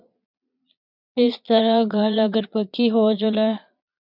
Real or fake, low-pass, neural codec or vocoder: fake; 5.4 kHz; vocoder, 22.05 kHz, 80 mel bands, Vocos